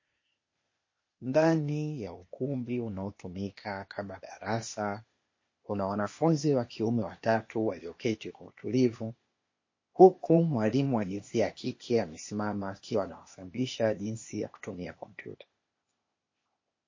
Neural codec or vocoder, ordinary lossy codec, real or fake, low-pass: codec, 16 kHz, 0.8 kbps, ZipCodec; MP3, 32 kbps; fake; 7.2 kHz